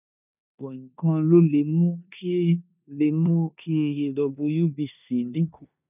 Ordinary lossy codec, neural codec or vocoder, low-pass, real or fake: none; codec, 16 kHz in and 24 kHz out, 0.9 kbps, LongCat-Audio-Codec, four codebook decoder; 3.6 kHz; fake